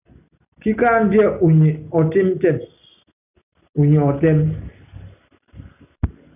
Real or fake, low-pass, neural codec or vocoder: real; 3.6 kHz; none